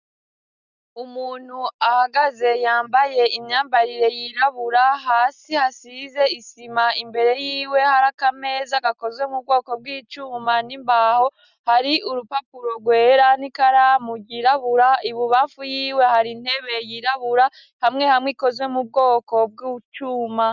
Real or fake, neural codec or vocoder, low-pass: real; none; 7.2 kHz